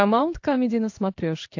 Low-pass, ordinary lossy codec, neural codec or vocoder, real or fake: 7.2 kHz; AAC, 48 kbps; codec, 16 kHz in and 24 kHz out, 1 kbps, XY-Tokenizer; fake